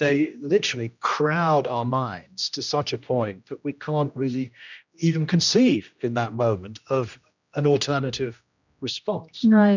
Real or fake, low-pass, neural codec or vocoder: fake; 7.2 kHz; codec, 16 kHz, 1 kbps, X-Codec, HuBERT features, trained on general audio